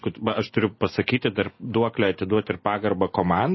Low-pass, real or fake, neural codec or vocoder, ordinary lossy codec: 7.2 kHz; real; none; MP3, 24 kbps